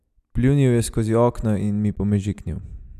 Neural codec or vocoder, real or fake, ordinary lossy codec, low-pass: none; real; none; 14.4 kHz